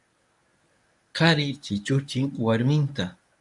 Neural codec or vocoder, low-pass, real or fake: codec, 24 kHz, 0.9 kbps, WavTokenizer, medium speech release version 1; 10.8 kHz; fake